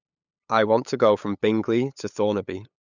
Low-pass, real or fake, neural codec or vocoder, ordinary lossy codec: 7.2 kHz; fake; codec, 16 kHz, 8 kbps, FunCodec, trained on LibriTTS, 25 frames a second; none